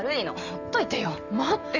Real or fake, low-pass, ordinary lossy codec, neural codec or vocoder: real; 7.2 kHz; none; none